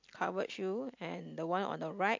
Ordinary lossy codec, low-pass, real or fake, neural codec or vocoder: MP3, 48 kbps; 7.2 kHz; real; none